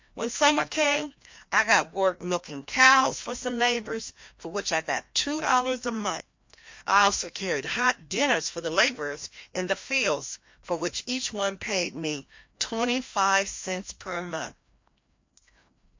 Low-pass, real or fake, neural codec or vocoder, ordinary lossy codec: 7.2 kHz; fake; codec, 16 kHz, 1 kbps, FreqCodec, larger model; MP3, 48 kbps